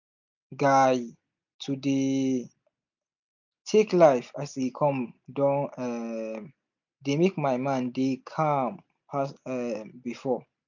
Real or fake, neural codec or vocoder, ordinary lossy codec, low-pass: real; none; none; 7.2 kHz